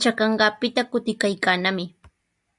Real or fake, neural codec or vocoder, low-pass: real; none; 10.8 kHz